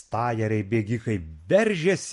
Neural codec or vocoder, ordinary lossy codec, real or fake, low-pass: none; MP3, 48 kbps; real; 14.4 kHz